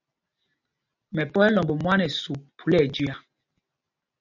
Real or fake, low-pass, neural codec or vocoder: real; 7.2 kHz; none